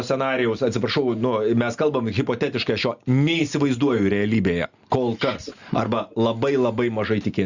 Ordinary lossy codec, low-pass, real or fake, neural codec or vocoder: Opus, 64 kbps; 7.2 kHz; real; none